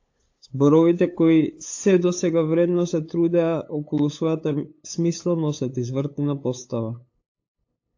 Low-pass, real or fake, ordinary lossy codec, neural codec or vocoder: 7.2 kHz; fake; AAC, 48 kbps; codec, 16 kHz, 8 kbps, FunCodec, trained on LibriTTS, 25 frames a second